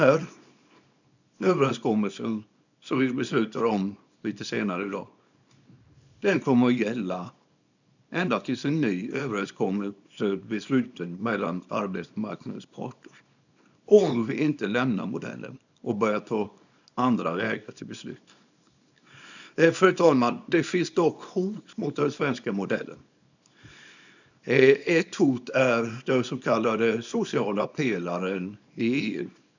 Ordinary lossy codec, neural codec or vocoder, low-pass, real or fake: none; codec, 24 kHz, 0.9 kbps, WavTokenizer, small release; 7.2 kHz; fake